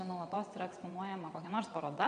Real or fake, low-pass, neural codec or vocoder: fake; 9.9 kHz; vocoder, 22.05 kHz, 80 mel bands, WaveNeXt